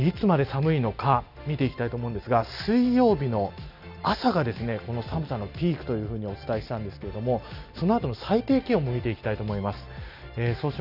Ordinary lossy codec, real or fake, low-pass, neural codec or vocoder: none; real; 5.4 kHz; none